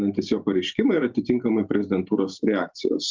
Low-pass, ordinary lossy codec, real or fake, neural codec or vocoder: 7.2 kHz; Opus, 24 kbps; fake; vocoder, 44.1 kHz, 128 mel bands every 512 samples, BigVGAN v2